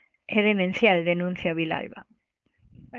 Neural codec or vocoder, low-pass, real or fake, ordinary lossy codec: codec, 16 kHz, 4.8 kbps, FACodec; 7.2 kHz; fake; Opus, 24 kbps